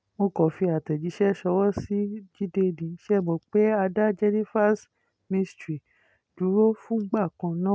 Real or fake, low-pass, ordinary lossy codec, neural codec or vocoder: real; none; none; none